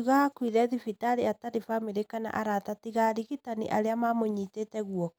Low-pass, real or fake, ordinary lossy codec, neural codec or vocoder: none; real; none; none